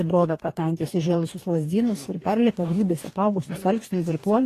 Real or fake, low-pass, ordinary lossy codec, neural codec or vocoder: fake; 14.4 kHz; AAC, 48 kbps; codec, 44.1 kHz, 2.6 kbps, DAC